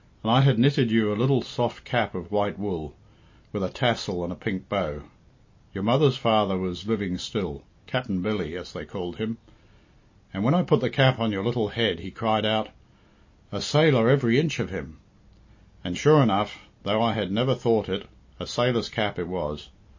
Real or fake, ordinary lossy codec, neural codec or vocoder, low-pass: real; MP3, 32 kbps; none; 7.2 kHz